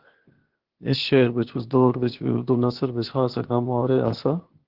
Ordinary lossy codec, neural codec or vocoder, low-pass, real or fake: Opus, 16 kbps; codec, 16 kHz, 0.8 kbps, ZipCodec; 5.4 kHz; fake